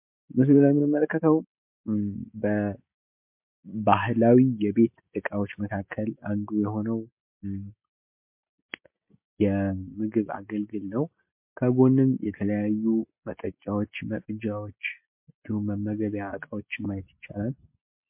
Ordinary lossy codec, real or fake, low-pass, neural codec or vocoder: MP3, 32 kbps; real; 3.6 kHz; none